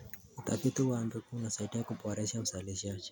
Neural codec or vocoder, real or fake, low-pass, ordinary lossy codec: none; real; none; none